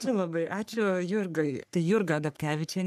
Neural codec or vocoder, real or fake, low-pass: codec, 44.1 kHz, 3.4 kbps, Pupu-Codec; fake; 14.4 kHz